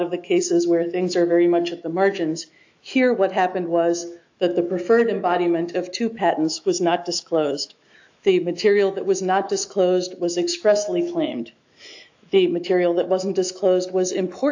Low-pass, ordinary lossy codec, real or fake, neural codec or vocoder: 7.2 kHz; AAC, 48 kbps; fake; autoencoder, 48 kHz, 128 numbers a frame, DAC-VAE, trained on Japanese speech